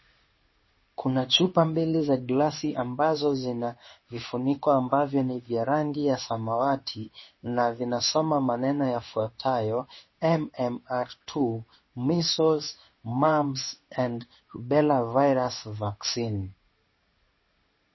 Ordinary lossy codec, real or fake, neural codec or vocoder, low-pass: MP3, 24 kbps; fake; codec, 16 kHz in and 24 kHz out, 1 kbps, XY-Tokenizer; 7.2 kHz